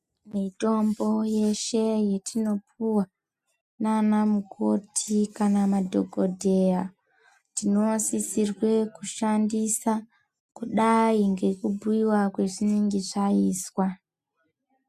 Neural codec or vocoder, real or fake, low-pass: none; real; 14.4 kHz